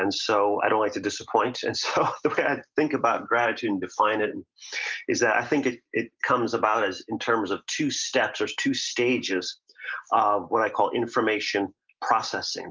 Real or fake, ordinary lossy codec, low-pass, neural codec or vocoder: real; Opus, 32 kbps; 7.2 kHz; none